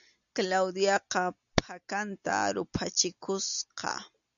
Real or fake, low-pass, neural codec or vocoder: real; 7.2 kHz; none